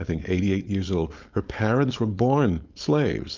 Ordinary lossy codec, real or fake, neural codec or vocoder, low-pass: Opus, 24 kbps; fake; codec, 16 kHz, 4.8 kbps, FACodec; 7.2 kHz